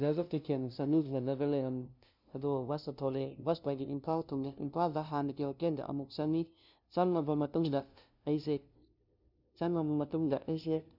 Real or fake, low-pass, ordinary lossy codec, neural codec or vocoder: fake; 5.4 kHz; none; codec, 16 kHz, 0.5 kbps, FunCodec, trained on LibriTTS, 25 frames a second